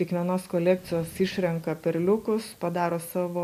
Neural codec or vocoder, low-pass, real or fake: none; 14.4 kHz; real